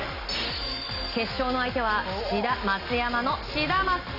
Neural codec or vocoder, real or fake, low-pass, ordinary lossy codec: none; real; 5.4 kHz; none